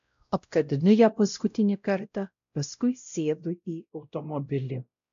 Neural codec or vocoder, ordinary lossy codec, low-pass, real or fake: codec, 16 kHz, 0.5 kbps, X-Codec, WavLM features, trained on Multilingual LibriSpeech; AAC, 64 kbps; 7.2 kHz; fake